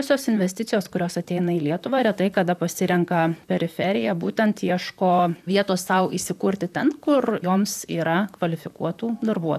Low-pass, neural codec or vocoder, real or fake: 14.4 kHz; vocoder, 44.1 kHz, 128 mel bands, Pupu-Vocoder; fake